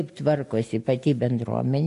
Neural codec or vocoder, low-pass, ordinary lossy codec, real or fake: none; 10.8 kHz; AAC, 48 kbps; real